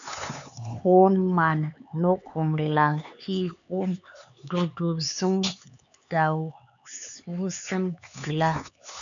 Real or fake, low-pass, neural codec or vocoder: fake; 7.2 kHz; codec, 16 kHz, 4 kbps, X-Codec, HuBERT features, trained on LibriSpeech